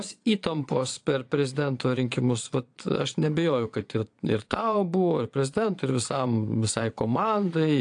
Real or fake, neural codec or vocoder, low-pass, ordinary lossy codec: fake; vocoder, 22.05 kHz, 80 mel bands, Vocos; 9.9 kHz; AAC, 64 kbps